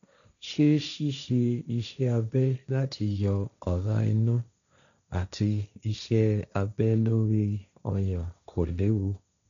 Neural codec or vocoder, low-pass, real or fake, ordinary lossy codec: codec, 16 kHz, 1.1 kbps, Voila-Tokenizer; 7.2 kHz; fake; none